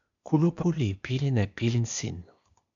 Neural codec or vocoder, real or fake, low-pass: codec, 16 kHz, 0.8 kbps, ZipCodec; fake; 7.2 kHz